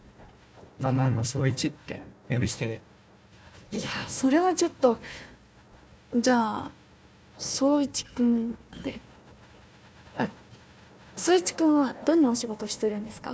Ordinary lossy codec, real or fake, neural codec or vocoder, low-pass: none; fake; codec, 16 kHz, 1 kbps, FunCodec, trained on Chinese and English, 50 frames a second; none